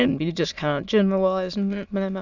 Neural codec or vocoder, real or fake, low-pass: autoencoder, 22.05 kHz, a latent of 192 numbers a frame, VITS, trained on many speakers; fake; 7.2 kHz